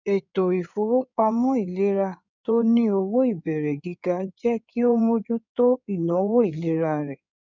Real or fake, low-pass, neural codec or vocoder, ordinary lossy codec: fake; 7.2 kHz; codec, 16 kHz in and 24 kHz out, 2.2 kbps, FireRedTTS-2 codec; none